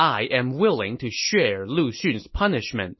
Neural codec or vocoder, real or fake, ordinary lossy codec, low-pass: none; real; MP3, 24 kbps; 7.2 kHz